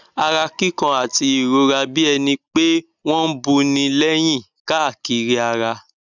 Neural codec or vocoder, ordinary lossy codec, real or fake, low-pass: none; none; real; 7.2 kHz